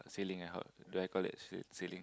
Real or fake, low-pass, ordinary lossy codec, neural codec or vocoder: real; none; none; none